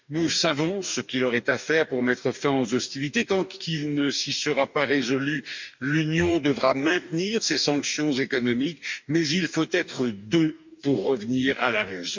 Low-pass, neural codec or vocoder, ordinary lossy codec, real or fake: 7.2 kHz; codec, 44.1 kHz, 2.6 kbps, DAC; MP3, 64 kbps; fake